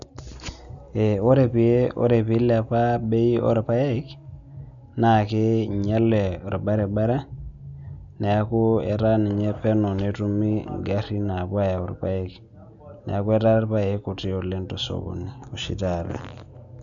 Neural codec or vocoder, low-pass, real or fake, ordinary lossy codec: none; 7.2 kHz; real; none